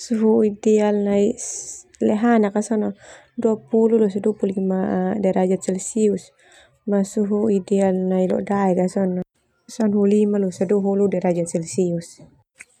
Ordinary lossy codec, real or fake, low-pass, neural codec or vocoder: none; real; 19.8 kHz; none